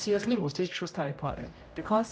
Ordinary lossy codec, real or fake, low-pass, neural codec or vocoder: none; fake; none; codec, 16 kHz, 0.5 kbps, X-Codec, HuBERT features, trained on general audio